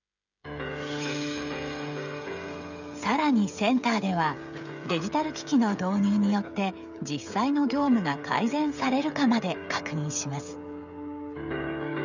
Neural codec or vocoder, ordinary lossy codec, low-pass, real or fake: codec, 16 kHz, 16 kbps, FreqCodec, smaller model; none; 7.2 kHz; fake